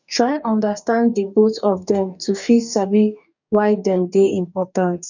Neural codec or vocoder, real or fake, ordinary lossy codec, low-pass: codec, 44.1 kHz, 2.6 kbps, DAC; fake; none; 7.2 kHz